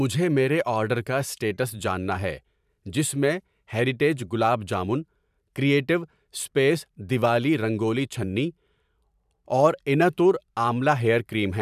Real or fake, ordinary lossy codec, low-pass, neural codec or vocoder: fake; none; 14.4 kHz; vocoder, 44.1 kHz, 128 mel bands every 512 samples, BigVGAN v2